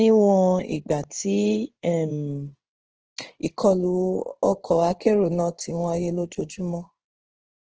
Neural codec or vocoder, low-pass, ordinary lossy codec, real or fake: codec, 16 kHz in and 24 kHz out, 1 kbps, XY-Tokenizer; 7.2 kHz; Opus, 16 kbps; fake